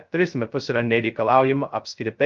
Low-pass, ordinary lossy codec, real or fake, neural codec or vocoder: 7.2 kHz; Opus, 24 kbps; fake; codec, 16 kHz, 0.2 kbps, FocalCodec